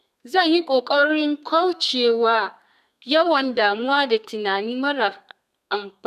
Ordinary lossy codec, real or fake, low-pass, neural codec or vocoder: none; fake; 14.4 kHz; codec, 32 kHz, 1.9 kbps, SNAC